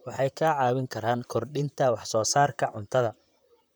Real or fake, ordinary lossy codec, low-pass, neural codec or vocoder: fake; none; none; vocoder, 44.1 kHz, 128 mel bands, Pupu-Vocoder